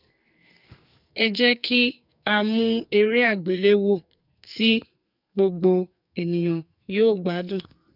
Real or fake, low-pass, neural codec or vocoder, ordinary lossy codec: fake; 5.4 kHz; codec, 44.1 kHz, 2.6 kbps, SNAC; none